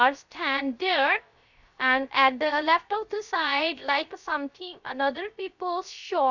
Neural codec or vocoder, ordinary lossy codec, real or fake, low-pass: codec, 16 kHz, 0.3 kbps, FocalCodec; none; fake; 7.2 kHz